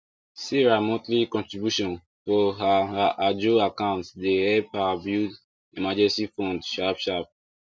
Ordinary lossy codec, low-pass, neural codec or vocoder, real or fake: none; none; none; real